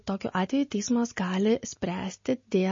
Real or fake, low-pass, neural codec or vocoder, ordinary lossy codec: real; 7.2 kHz; none; MP3, 32 kbps